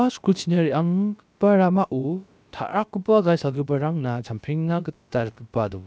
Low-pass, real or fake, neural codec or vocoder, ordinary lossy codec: none; fake; codec, 16 kHz, about 1 kbps, DyCAST, with the encoder's durations; none